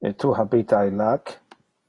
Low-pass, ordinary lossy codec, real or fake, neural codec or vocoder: 10.8 kHz; AAC, 32 kbps; real; none